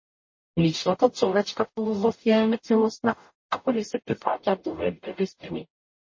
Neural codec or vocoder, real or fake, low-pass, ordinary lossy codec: codec, 44.1 kHz, 0.9 kbps, DAC; fake; 7.2 kHz; MP3, 32 kbps